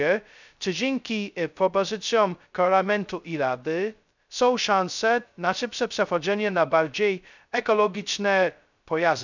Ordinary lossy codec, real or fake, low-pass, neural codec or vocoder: none; fake; 7.2 kHz; codec, 16 kHz, 0.2 kbps, FocalCodec